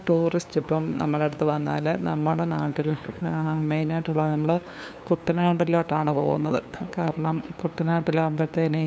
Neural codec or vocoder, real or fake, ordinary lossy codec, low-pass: codec, 16 kHz, 2 kbps, FunCodec, trained on LibriTTS, 25 frames a second; fake; none; none